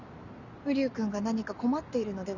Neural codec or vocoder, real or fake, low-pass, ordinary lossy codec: none; real; 7.2 kHz; none